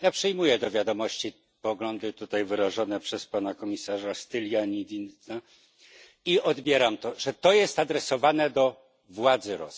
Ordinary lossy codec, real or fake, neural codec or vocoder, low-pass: none; real; none; none